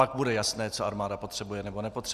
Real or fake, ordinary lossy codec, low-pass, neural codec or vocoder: real; Opus, 64 kbps; 14.4 kHz; none